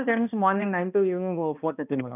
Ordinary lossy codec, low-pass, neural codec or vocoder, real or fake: none; 3.6 kHz; codec, 16 kHz, 1 kbps, X-Codec, HuBERT features, trained on balanced general audio; fake